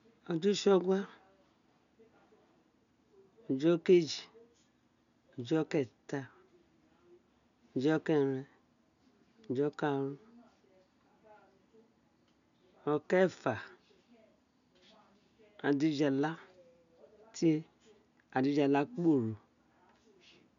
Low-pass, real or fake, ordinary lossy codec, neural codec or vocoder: 7.2 kHz; real; none; none